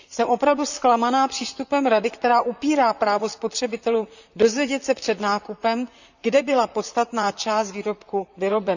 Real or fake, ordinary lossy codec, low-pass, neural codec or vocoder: fake; none; 7.2 kHz; vocoder, 44.1 kHz, 128 mel bands, Pupu-Vocoder